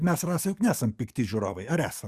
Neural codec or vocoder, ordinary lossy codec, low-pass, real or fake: codec, 44.1 kHz, 7.8 kbps, DAC; Opus, 64 kbps; 14.4 kHz; fake